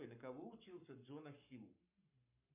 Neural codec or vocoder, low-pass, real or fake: codec, 44.1 kHz, 7.8 kbps, Pupu-Codec; 3.6 kHz; fake